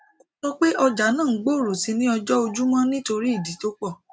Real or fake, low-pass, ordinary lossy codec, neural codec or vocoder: real; none; none; none